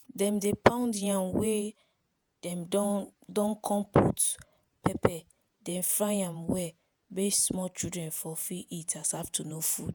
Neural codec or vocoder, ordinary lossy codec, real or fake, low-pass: vocoder, 48 kHz, 128 mel bands, Vocos; none; fake; none